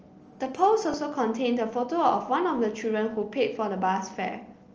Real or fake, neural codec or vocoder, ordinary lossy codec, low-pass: real; none; Opus, 24 kbps; 7.2 kHz